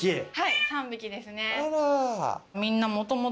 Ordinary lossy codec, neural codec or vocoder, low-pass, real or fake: none; none; none; real